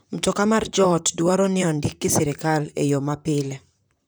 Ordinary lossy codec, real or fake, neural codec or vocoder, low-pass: none; fake; vocoder, 44.1 kHz, 128 mel bands, Pupu-Vocoder; none